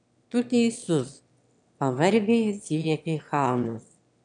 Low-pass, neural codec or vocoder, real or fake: 9.9 kHz; autoencoder, 22.05 kHz, a latent of 192 numbers a frame, VITS, trained on one speaker; fake